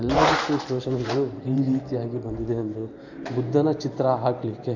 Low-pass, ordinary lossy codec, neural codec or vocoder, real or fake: 7.2 kHz; none; vocoder, 44.1 kHz, 128 mel bands every 512 samples, BigVGAN v2; fake